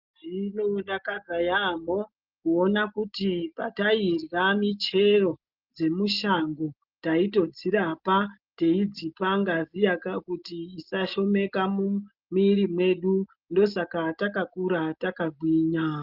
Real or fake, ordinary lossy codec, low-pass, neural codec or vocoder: real; Opus, 32 kbps; 5.4 kHz; none